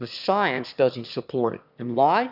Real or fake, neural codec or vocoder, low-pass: fake; autoencoder, 22.05 kHz, a latent of 192 numbers a frame, VITS, trained on one speaker; 5.4 kHz